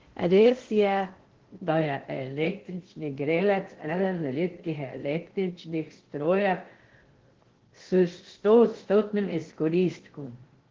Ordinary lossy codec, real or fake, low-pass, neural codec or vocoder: Opus, 16 kbps; fake; 7.2 kHz; codec, 16 kHz in and 24 kHz out, 0.6 kbps, FocalCodec, streaming, 2048 codes